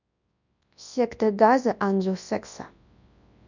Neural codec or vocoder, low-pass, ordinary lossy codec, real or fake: codec, 24 kHz, 0.9 kbps, WavTokenizer, large speech release; 7.2 kHz; none; fake